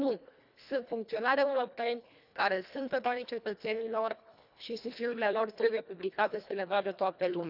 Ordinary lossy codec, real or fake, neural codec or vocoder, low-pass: none; fake; codec, 24 kHz, 1.5 kbps, HILCodec; 5.4 kHz